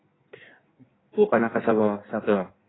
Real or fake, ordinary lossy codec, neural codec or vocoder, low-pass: fake; AAC, 16 kbps; codec, 16 kHz in and 24 kHz out, 1.1 kbps, FireRedTTS-2 codec; 7.2 kHz